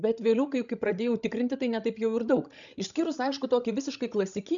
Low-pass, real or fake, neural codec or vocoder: 7.2 kHz; fake; codec, 16 kHz, 16 kbps, FreqCodec, larger model